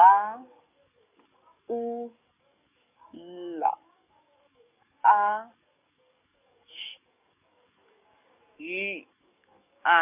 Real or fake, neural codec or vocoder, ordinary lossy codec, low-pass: fake; codec, 44.1 kHz, 7.8 kbps, DAC; none; 3.6 kHz